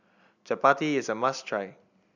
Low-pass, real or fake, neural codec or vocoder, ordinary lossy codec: 7.2 kHz; real; none; none